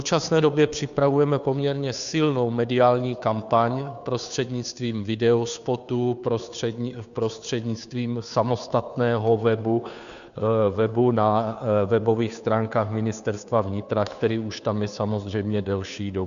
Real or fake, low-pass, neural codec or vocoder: fake; 7.2 kHz; codec, 16 kHz, 2 kbps, FunCodec, trained on Chinese and English, 25 frames a second